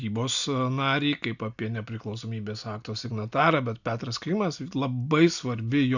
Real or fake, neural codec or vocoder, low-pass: real; none; 7.2 kHz